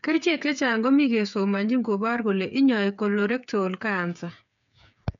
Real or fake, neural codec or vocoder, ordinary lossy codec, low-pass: fake; codec, 16 kHz, 8 kbps, FreqCodec, smaller model; none; 7.2 kHz